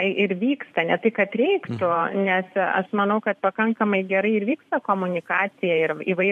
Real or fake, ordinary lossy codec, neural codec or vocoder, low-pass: fake; MP3, 64 kbps; vocoder, 44.1 kHz, 128 mel bands every 512 samples, BigVGAN v2; 14.4 kHz